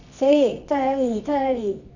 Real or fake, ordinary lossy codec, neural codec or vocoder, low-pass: fake; none; codec, 24 kHz, 0.9 kbps, WavTokenizer, medium music audio release; 7.2 kHz